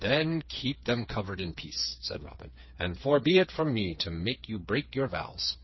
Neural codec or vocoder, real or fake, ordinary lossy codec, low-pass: codec, 16 kHz, 4 kbps, FreqCodec, smaller model; fake; MP3, 24 kbps; 7.2 kHz